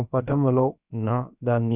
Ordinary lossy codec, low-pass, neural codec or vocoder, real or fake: none; 3.6 kHz; codec, 16 kHz, about 1 kbps, DyCAST, with the encoder's durations; fake